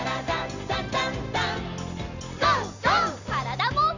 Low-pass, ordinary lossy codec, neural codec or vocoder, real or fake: 7.2 kHz; none; none; real